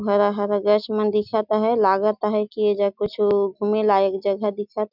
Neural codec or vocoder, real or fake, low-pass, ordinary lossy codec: none; real; 5.4 kHz; none